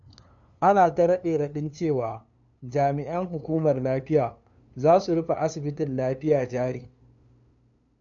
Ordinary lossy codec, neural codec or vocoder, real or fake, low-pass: none; codec, 16 kHz, 2 kbps, FunCodec, trained on LibriTTS, 25 frames a second; fake; 7.2 kHz